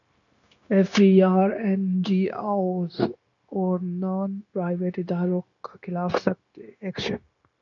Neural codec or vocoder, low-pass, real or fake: codec, 16 kHz, 0.9 kbps, LongCat-Audio-Codec; 7.2 kHz; fake